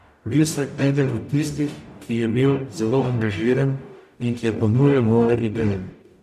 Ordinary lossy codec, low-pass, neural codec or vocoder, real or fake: none; 14.4 kHz; codec, 44.1 kHz, 0.9 kbps, DAC; fake